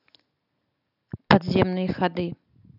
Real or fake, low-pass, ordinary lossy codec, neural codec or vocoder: real; 5.4 kHz; none; none